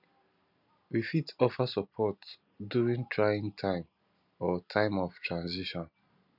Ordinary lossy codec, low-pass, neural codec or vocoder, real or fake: none; 5.4 kHz; none; real